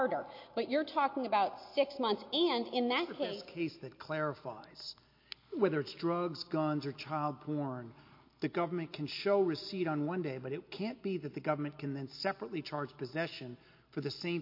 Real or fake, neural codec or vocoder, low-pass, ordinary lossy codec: real; none; 5.4 kHz; MP3, 32 kbps